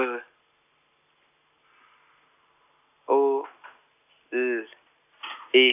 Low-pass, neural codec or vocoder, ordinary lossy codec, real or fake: 3.6 kHz; none; none; real